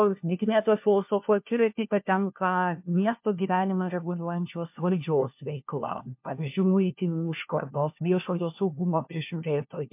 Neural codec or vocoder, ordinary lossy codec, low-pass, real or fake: codec, 16 kHz, 1 kbps, FunCodec, trained on LibriTTS, 50 frames a second; MP3, 32 kbps; 3.6 kHz; fake